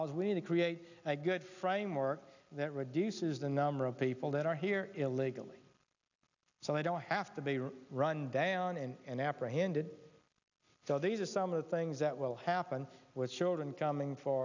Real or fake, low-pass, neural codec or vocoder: real; 7.2 kHz; none